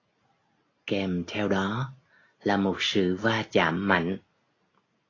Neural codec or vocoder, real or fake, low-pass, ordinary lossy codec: none; real; 7.2 kHz; AAC, 32 kbps